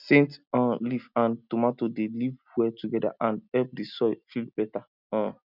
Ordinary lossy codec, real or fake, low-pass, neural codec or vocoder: none; real; 5.4 kHz; none